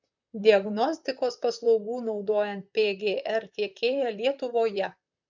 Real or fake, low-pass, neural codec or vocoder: fake; 7.2 kHz; vocoder, 44.1 kHz, 128 mel bands, Pupu-Vocoder